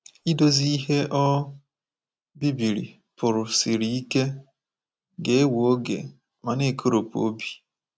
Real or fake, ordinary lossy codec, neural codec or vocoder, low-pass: real; none; none; none